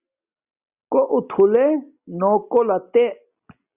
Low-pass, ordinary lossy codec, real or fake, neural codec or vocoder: 3.6 kHz; Opus, 64 kbps; real; none